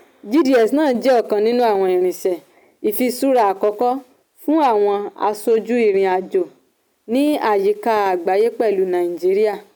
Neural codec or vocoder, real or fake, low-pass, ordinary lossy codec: none; real; none; none